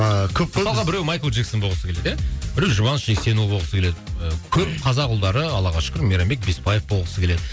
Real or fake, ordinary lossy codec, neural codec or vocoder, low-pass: real; none; none; none